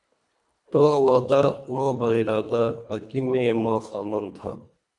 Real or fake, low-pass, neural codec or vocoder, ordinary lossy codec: fake; 10.8 kHz; codec, 24 kHz, 1.5 kbps, HILCodec; MP3, 96 kbps